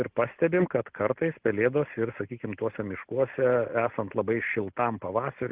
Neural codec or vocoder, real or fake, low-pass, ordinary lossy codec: none; real; 3.6 kHz; Opus, 16 kbps